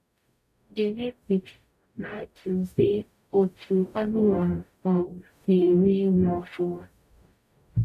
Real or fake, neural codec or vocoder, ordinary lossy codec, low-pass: fake; codec, 44.1 kHz, 0.9 kbps, DAC; none; 14.4 kHz